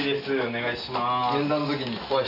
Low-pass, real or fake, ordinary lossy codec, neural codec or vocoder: 5.4 kHz; real; AAC, 32 kbps; none